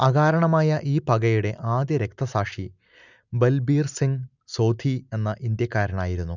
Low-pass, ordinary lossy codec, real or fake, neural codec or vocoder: 7.2 kHz; none; real; none